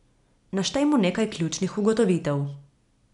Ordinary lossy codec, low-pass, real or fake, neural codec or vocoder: none; 10.8 kHz; real; none